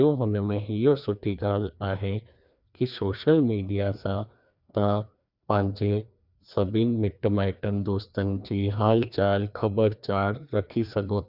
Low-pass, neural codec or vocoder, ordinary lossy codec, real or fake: 5.4 kHz; codec, 16 kHz, 2 kbps, FreqCodec, larger model; none; fake